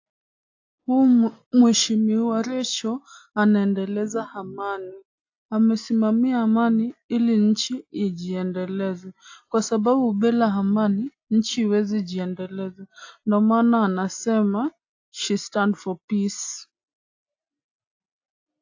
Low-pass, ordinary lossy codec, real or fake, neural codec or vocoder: 7.2 kHz; AAC, 48 kbps; real; none